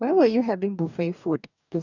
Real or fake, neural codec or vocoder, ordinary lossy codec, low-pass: fake; codec, 44.1 kHz, 2.6 kbps, DAC; none; 7.2 kHz